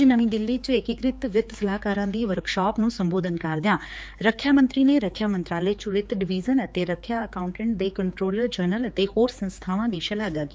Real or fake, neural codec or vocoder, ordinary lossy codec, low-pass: fake; codec, 16 kHz, 4 kbps, X-Codec, HuBERT features, trained on general audio; none; none